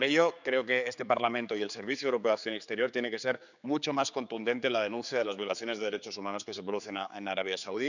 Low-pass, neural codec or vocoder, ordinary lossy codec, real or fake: 7.2 kHz; codec, 16 kHz, 4 kbps, X-Codec, HuBERT features, trained on general audio; none; fake